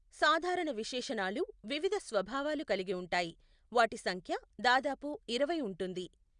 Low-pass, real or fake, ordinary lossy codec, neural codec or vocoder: 9.9 kHz; real; Opus, 64 kbps; none